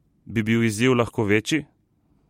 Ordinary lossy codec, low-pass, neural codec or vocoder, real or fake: MP3, 64 kbps; 19.8 kHz; vocoder, 44.1 kHz, 128 mel bands every 512 samples, BigVGAN v2; fake